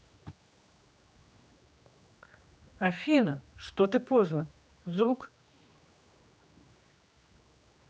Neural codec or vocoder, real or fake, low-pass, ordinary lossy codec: codec, 16 kHz, 2 kbps, X-Codec, HuBERT features, trained on general audio; fake; none; none